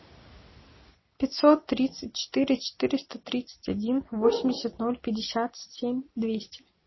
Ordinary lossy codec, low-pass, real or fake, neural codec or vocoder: MP3, 24 kbps; 7.2 kHz; real; none